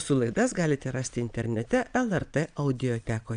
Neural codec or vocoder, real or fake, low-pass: vocoder, 22.05 kHz, 80 mel bands, Vocos; fake; 9.9 kHz